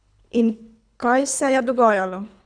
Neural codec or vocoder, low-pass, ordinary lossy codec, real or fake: codec, 24 kHz, 3 kbps, HILCodec; 9.9 kHz; none; fake